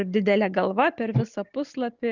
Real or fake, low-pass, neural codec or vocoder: real; 7.2 kHz; none